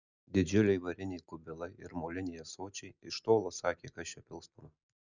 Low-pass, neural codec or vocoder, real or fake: 7.2 kHz; none; real